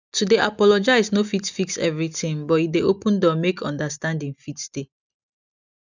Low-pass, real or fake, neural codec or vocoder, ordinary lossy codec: 7.2 kHz; real; none; none